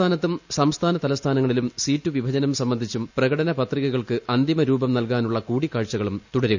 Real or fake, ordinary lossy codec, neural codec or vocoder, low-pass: real; none; none; 7.2 kHz